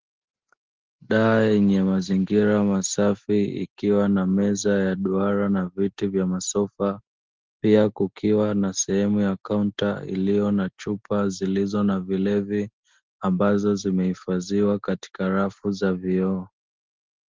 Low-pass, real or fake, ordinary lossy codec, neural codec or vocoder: 7.2 kHz; real; Opus, 16 kbps; none